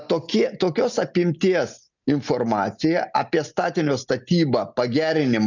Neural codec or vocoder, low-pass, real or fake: none; 7.2 kHz; real